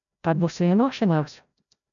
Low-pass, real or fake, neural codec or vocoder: 7.2 kHz; fake; codec, 16 kHz, 0.5 kbps, FreqCodec, larger model